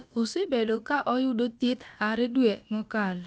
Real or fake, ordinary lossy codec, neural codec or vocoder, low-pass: fake; none; codec, 16 kHz, about 1 kbps, DyCAST, with the encoder's durations; none